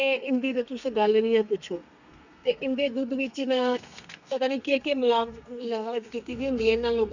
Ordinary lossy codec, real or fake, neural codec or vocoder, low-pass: none; fake; codec, 32 kHz, 1.9 kbps, SNAC; 7.2 kHz